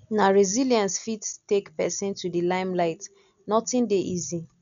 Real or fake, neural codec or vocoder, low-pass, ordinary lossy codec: real; none; 7.2 kHz; none